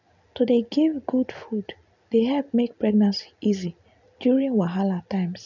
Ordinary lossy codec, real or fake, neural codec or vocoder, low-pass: none; real; none; 7.2 kHz